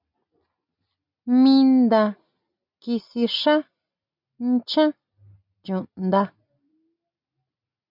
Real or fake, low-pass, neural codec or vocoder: real; 5.4 kHz; none